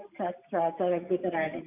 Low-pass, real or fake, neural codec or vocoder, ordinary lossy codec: 3.6 kHz; real; none; none